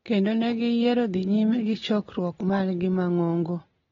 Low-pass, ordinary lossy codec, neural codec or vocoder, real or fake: 7.2 kHz; AAC, 24 kbps; none; real